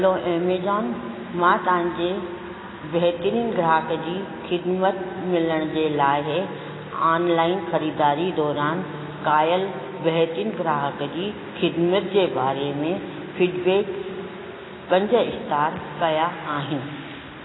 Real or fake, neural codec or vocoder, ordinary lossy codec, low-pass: real; none; AAC, 16 kbps; 7.2 kHz